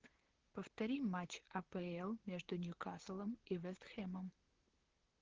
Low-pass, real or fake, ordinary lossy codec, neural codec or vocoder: 7.2 kHz; fake; Opus, 16 kbps; vocoder, 44.1 kHz, 128 mel bands, Pupu-Vocoder